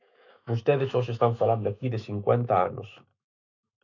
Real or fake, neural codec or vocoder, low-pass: fake; autoencoder, 48 kHz, 128 numbers a frame, DAC-VAE, trained on Japanese speech; 7.2 kHz